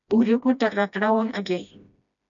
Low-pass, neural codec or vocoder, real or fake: 7.2 kHz; codec, 16 kHz, 1 kbps, FreqCodec, smaller model; fake